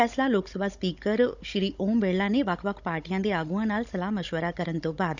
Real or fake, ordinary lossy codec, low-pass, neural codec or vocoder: fake; none; 7.2 kHz; codec, 16 kHz, 16 kbps, FunCodec, trained on Chinese and English, 50 frames a second